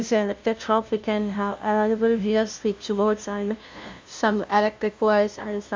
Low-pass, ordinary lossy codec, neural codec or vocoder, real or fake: none; none; codec, 16 kHz, 0.5 kbps, FunCodec, trained on LibriTTS, 25 frames a second; fake